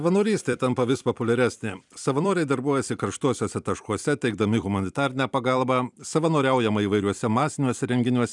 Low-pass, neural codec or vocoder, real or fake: 10.8 kHz; vocoder, 44.1 kHz, 128 mel bands every 256 samples, BigVGAN v2; fake